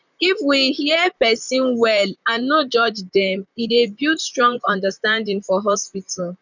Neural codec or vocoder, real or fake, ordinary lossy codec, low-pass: vocoder, 44.1 kHz, 128 mel bands, Pupu-Vocoder; fake; none; 7.2 kHz